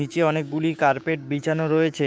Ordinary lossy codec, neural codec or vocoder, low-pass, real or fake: none; codec, 16 kHz, 6 kbps, DAC; none; fake